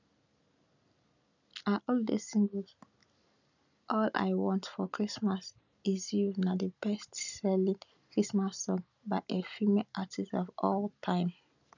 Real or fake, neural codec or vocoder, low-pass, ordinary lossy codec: real; none; 7.2 kHz; none